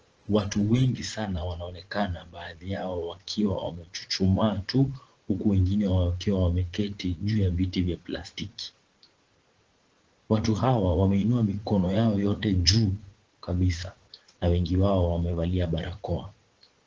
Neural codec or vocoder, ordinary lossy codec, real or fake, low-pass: vocoder, 44.1 kHz, 80 mel bands, Vocos; Opus, 16 kbps; fake; 7.2 kHz